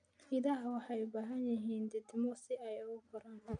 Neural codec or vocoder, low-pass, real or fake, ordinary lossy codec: none; 9.9 kHz; real; none